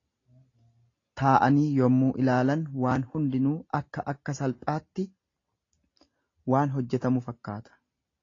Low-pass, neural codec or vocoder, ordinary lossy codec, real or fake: 7.2 kHz; none; AAC, 32 kbps; real